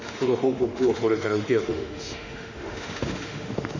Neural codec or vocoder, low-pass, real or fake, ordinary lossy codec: autoencoder, 48 kHz, 32 numbers a frame, DAC-VAE, trained on Japanese speech; 7.2 kHz; fake; none